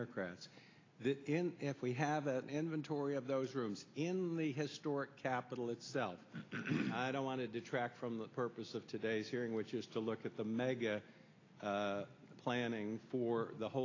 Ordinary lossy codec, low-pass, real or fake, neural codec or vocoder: AAC, 32 kbps; 7.2 kHz; real; none